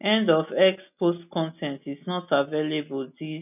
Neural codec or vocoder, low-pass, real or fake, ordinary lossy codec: none; 3.6 kHz; real; none